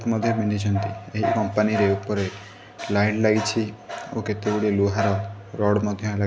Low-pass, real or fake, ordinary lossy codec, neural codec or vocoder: none; real; none; none